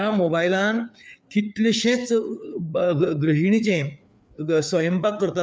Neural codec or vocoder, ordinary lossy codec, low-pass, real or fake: codec, 16 kHz, 4 kbps, FreqCodec, larger model; none; none; fake